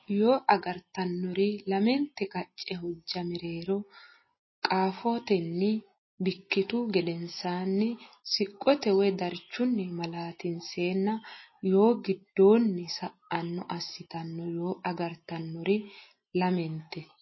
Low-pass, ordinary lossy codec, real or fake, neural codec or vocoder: 7.2 kHz; MP3, 24 kbps; real; none